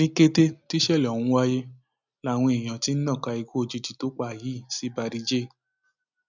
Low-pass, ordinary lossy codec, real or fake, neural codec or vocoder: 7.2 kHz; none; real; none